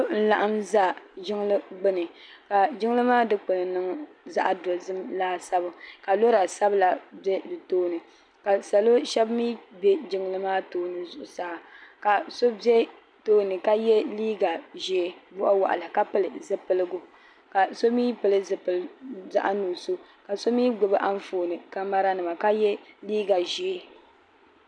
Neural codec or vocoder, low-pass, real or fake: none; 9.9 kHz; real